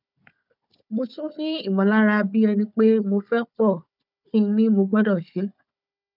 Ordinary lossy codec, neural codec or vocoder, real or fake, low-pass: none; codec, 16 kHz, 4 kbps, FunCodec, trained on Chinese and English, 50 frames a second; fake; 5.4 kHz